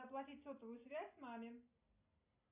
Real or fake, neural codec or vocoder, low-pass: real; none; 3.6 kHz